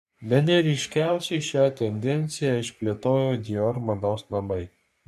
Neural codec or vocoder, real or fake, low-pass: codec, 44.1 kHz, 3.4 kbps, Pupu-Codec; fake; 14.4 kHz